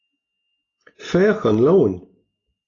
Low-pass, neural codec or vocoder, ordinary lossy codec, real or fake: 7.2 kHz; none; AAC, 32 kbps; real